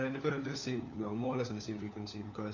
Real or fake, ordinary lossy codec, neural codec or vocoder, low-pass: fake; none; codec, 16 kHz, 4 kbps, FunCodec, trained on LibriTTS, 50 frames a second; 7.2 kHz